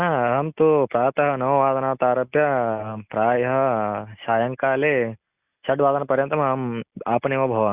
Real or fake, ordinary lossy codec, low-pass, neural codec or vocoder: real; Opus, 64 kbps; 3.6 kHz; none